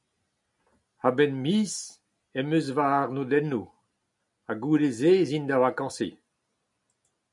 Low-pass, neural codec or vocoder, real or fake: 10.8 kHz; none; real